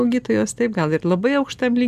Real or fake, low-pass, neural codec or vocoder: real; 14.4 kHz; none